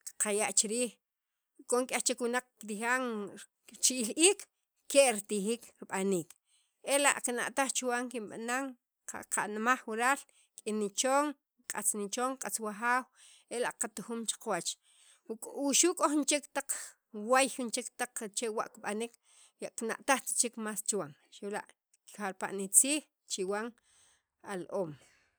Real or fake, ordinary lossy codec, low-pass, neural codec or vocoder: real; none; none; none